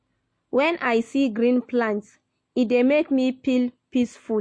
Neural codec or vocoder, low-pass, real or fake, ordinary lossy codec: none; 9.9 kHz; real; MP3, 48 kbps